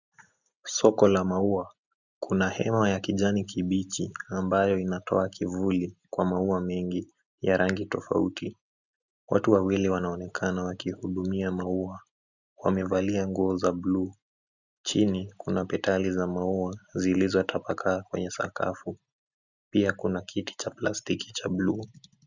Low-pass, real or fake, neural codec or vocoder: 7.2 kHz; real; none